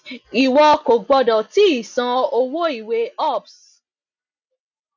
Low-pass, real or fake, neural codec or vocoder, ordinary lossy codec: 7.2 kHz; real; none; none